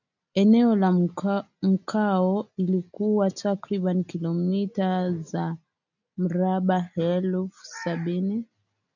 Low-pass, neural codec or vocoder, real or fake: 7.2 kHz; none; real